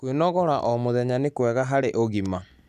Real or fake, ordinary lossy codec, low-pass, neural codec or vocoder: real; none; 14.4 kHz; none